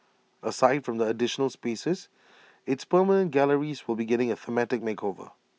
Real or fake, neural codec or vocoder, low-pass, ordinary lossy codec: real; none; none; none